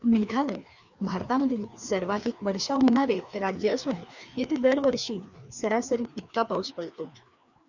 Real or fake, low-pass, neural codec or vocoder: fake; 7.2 kHz; codec, 16 kHz, 2 kbps, FreqCodec, larger model